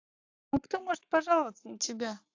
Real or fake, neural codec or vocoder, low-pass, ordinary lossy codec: fake; codec, 44.1 kHz, 7.8 kbps, Pupu-Codec; 7.2 kHz; AAC, 48 kbps